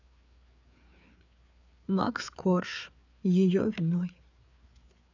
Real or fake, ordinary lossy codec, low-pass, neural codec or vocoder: fake; none; 7.2 kHz; codec, 16 kHz, 4 kbps, FreqCodec, larger model